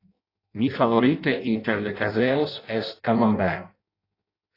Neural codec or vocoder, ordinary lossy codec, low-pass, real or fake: codec, 16 kHz in and 24 kHz out, 0.6 kbps, FireRedTTS-2 codec; AAC, 32 kbps; 5.4 kHz; fake